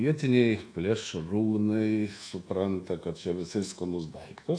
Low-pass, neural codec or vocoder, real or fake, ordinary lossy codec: 9.9 kHz; codec, 24 kHz, 1.2 kbps, DualCodec; fake; AAC, 48 kbps